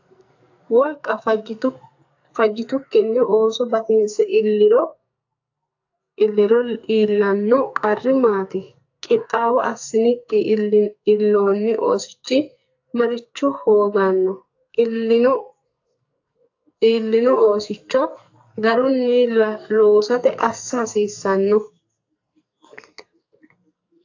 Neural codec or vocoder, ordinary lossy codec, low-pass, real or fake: codec, 44.1 kHz, 2.6 kbps, SNAC; AAC, 48 kbps; 7.2 kHz; fake